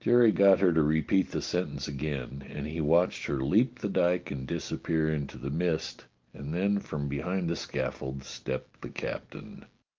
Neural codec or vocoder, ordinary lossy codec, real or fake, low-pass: none; Opus, 32 kbps; real; 7.2 kHz